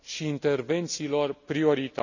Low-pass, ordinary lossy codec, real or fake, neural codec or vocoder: 7.2 kHz; none; real; none